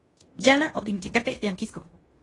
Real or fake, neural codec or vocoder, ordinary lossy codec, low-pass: fake; codec, 16 kHz in and 24 kHz out, 0.9 kbps, LongCat-Audio-Codec, fine tuned four codebook decoder; AAC, 32 kbps; 10.8 kHz